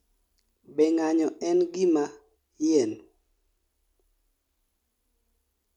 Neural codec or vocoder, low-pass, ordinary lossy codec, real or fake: none; 19.8 kHz; none; real